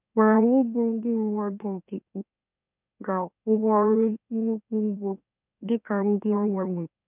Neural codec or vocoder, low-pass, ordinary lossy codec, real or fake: autoencoder, 44.1 kHz, a latent of 192 numbers a frame, MeloTTS; 3.6 kHz; none; fake